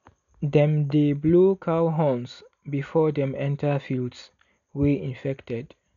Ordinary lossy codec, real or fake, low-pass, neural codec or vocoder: none; real; 7.2 kHz; none